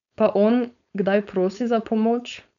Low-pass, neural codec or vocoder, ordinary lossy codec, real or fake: 7.2 kHz; codec, 16 kHz, 4.8 kbps, FACodec; none; fake